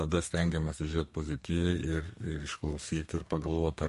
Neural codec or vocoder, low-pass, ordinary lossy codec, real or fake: codec, 32 kHz, 1.9 kbps, SNAC; 14.4 kHz; MP3, 48 kbps; fake